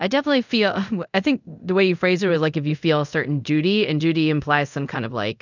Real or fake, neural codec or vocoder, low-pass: fake; codec, 24 kHz, 0.5 kbps, DualCodec; 7.2 kHz